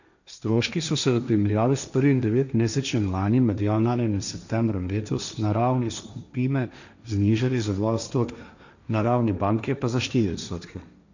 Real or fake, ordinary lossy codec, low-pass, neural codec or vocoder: fake; none; 7.2 kHz; codec, 16 kHz, 1.1 kbps, Voila-Tokenizer